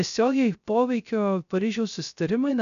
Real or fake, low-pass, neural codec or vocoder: fake; 7.2 kHz; codec, 16 kHz, 0.3 kbps, FocalCodec